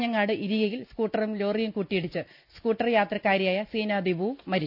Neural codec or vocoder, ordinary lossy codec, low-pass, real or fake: none; none; 5.4 kHz; real